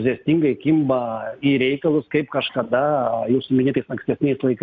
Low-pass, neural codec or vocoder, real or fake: 7.2 kHz; none; real